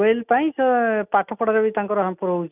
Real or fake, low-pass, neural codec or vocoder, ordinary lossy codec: real; 3.6 kHz; none; none